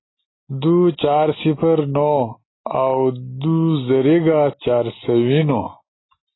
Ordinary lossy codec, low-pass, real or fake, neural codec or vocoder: AAC, 16 kbps; 7.2 kHz; real; none